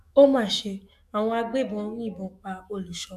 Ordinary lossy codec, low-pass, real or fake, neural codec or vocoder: none; 14.4 kHz; fake; autoencoder, 48 kHz, 128 numbers a frame, DAC-VAE, trained on Japanese speech